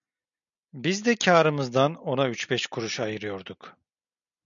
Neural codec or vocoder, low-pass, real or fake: none; 7.2 kHz; real